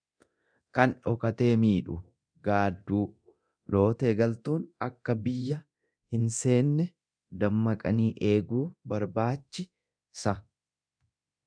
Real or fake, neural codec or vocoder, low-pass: fake; codec, 24 kHz, 0.9 kbps, DualCodec; 9.9 kHz